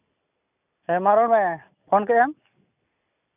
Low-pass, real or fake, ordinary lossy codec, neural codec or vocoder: 3.6 kHz; real; none; none